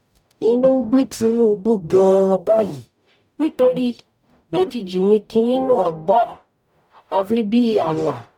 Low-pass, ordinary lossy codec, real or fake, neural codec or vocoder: 19.8 kHz; none; fake; codec, 44.1 kHz, 0.9 kbps, DAC